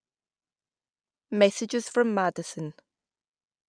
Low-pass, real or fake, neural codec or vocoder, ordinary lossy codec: 9.9 kHz; real; none; none